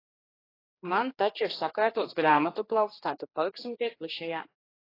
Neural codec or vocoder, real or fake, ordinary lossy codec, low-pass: codec, 16 kHz, 2 kbps, X-Codec, HuBERT features, trained on general audio; fake; AAC, 24 kbps; 5.4 kHz